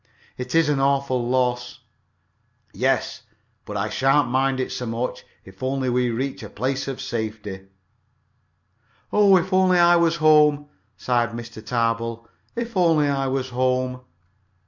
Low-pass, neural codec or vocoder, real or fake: 7.2 kHz; none; real